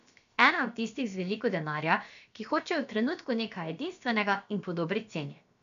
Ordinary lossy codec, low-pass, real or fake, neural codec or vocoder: none; 7.2 kHz; fake; codec, 16 kHz, about 1 kbps, DyCAST, with the encoder's durations